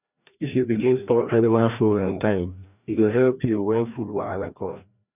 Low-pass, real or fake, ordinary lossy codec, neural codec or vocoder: 3.6 kHz; fake; none; codec, 16 kHz, 1 kbps, FreqCodec, larger model